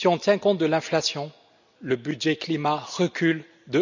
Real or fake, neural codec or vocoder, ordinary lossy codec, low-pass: real; none; none; 7.2 kHz